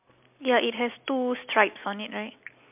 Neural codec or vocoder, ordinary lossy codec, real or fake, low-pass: none; MP3, 32 kbps; real; 3.6 kHz